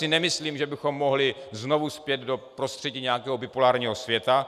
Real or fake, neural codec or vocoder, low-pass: real; none; 14.4 kHz